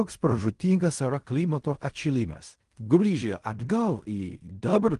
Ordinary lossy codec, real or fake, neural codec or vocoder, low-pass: Opus, 24 kbps; fake; codec, 16 kHz in and 24 kHz out, 0.4 kbps, LongCat-Audio-Codec, fine tuned four codebook decoder; 10.8 kHz